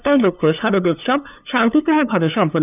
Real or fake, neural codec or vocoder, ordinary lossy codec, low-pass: fake; codec, 16 kHz, 2 kbps, FunCodec, trained on LibriTTS, 25 frames a second; none; 3.6 kHz